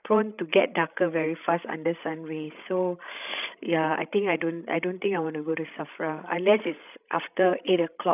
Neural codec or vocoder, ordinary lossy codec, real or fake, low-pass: codec, 16 kHz, 16 kbps, FreqCodec, larger model; none; fake; 3.6 kHz